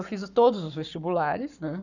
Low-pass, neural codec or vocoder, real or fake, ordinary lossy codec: 7.2 kHz; codec, 44.1 kHz, 7.8 kbps, Pupu-Codec; fake; none